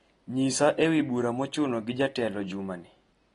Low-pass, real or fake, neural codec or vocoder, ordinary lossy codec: 10.8 kHz; real; none; AAC, 32 kbps